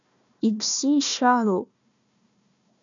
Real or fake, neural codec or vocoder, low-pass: fake; codec, 16 kHz, 1 kbps, FunCodec, trained on Chinese and English, 50 frames a second; 7.2 kHz